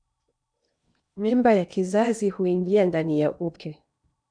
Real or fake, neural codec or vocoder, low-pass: fake; codec, 16 kHz in and 24 kHz out, 0.8 kbps, FocalCodec, streaming, 65536 codes; 9.9 kHz